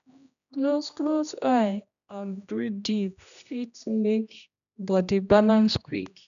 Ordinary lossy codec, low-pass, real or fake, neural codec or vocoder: none; 7.2 kHz; fake; codec, 16 kHz, 1 kbps, X-Codec, HuBERT features, trained on general audio